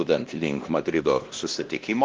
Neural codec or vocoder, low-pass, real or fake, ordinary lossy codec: codec, 16 kHz, 1 kbps, X-Codec, WavLM features, trained on Multilingual LibriSpeech; 7.2 kHz; fake; Opus, 16 kbps